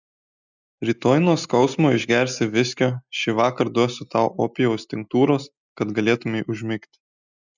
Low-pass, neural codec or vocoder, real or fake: 7.2 kHz; none; real